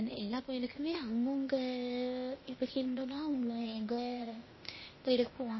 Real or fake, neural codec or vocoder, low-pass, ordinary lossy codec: fake; codec, 16 kHz, 0.8 kbps, ZipCodec; 7.2 kHz; MP3, 24 kbps